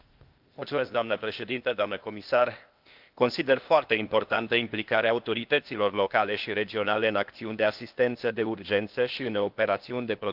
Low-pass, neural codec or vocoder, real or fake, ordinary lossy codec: 5.4 kHz; codec, 16 kHz, 0.8 kbps, ZipCodec; fake; Opus, 24 kbps